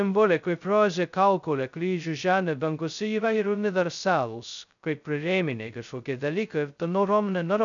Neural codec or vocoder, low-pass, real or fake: codec, 16 kHz, 0.2 kbps, FocalCodec; 7.2 kHz; fake